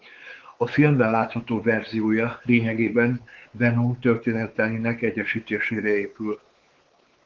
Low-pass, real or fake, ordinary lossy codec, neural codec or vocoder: 7.2 kHz; fake; Opus, 16 kbps; codec, 16 kHz, 4 kbps, X-Codec, WavLM features, trained on Multilingual LibriSpeech